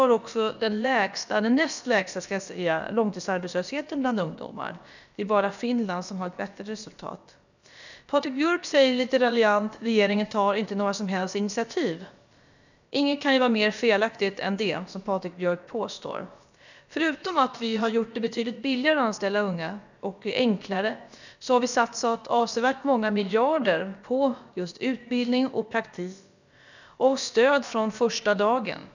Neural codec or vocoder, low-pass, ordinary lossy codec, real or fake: codec, 16 kHz, about 1 kbps, DyCAST, with the encoder's durations; 7.2 kHz; none; fake